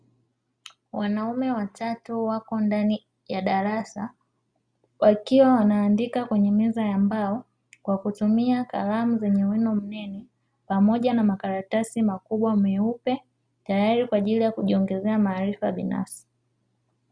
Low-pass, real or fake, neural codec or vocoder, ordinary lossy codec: 9.9 kHz; real; none; Opus, 64 kbps